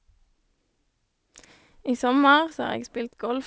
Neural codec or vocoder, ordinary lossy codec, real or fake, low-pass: none; none; real; none